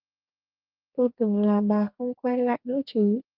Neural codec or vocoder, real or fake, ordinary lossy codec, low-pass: codec, 32 kHz, 1.9 kbps, SNAC; fake; Opus, 24 kbps; 5.4 kHz